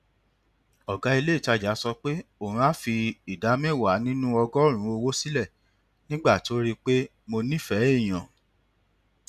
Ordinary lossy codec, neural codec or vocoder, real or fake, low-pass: none; none; real; 14.4 kHz